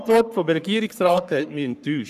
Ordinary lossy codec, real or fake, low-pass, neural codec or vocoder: none; fake; 14.4 kHz; codec, 44.1 kHz, 3.4 kbps, Pupu-Codec